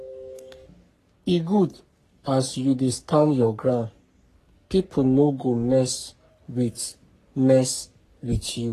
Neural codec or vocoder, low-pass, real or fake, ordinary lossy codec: codec, 44.1 kHz, 3.4 kbps, Pupu-Codec; 14.4 kHz; fake; AAC, 48 kbps